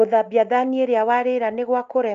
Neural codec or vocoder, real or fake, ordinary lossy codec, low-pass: none; real; Opus, 32 kbps; 7.2 kHz